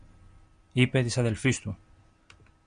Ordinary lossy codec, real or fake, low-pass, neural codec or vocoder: MP3, 64 kbps; real; 9.9 kHz; none